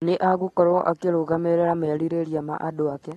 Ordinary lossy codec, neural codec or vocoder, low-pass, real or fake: AAC, 32 kbps; none; 14.4 kHz; real